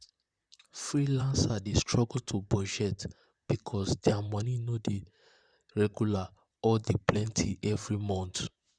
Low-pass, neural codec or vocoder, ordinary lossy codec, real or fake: 9.9 kHz; vocoder, 44.1 kHz, 128 mel bands, Pupu-Vocoder; Opus, 64 kbps; fake